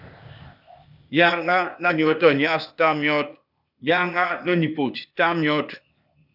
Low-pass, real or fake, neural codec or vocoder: 5.4 kHz; fake; codec, 16 kHz, 0.8 kbps, ZipCodec